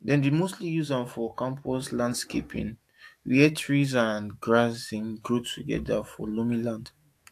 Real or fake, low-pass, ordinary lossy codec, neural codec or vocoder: fake; 14.4 kHz; AAC, 64 kbps; autoencoder, 48 kHz, 128 numbers a frame, DAC-VAE, trained on Japanese speech